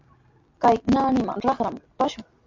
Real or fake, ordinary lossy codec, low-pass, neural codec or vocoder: real; MP3, 48 kbps; 7.2 kHz; none